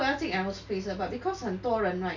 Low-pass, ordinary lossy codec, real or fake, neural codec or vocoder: 7.2 kHz; none; real; none